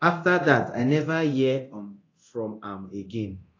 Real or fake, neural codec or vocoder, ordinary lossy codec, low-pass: fake; codec, 24 kHz, 0.9 kbps, DualCodec; AAC, 32 kbps; 7.2 kHz